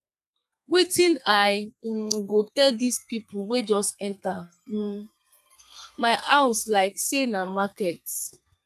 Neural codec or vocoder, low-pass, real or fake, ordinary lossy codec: codec, 32 kHz, 1.9 kbps, SNAC; 14.4 kHz; fake; none